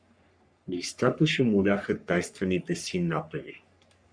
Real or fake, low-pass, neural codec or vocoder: fake; 9.9 kHz; codec, 44.1 kHz, 3.4 kbps, Pupu-Codec